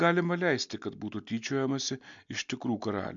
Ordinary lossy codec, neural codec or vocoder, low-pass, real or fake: MP3, 64 kbps; none; 7.2 kHz; real